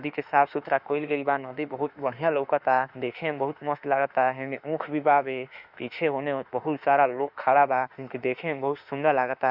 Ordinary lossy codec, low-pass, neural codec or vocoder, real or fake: Opus, 64 kbps; 5.4 kHz; autoencoder, 48 kHz, 32 numbers a frame, DAC-VAE, trained on Japanese speech; fake